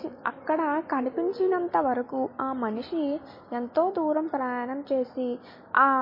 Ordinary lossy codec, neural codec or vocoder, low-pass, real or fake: MP3, 24 kbps; none; 5.4 kHz; real